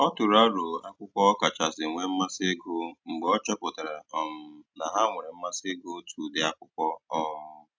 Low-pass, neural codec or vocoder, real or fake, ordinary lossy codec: none; none; real; none